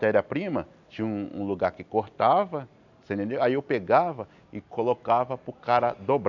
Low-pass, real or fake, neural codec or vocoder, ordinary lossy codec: 7.2 kHz; real; none; none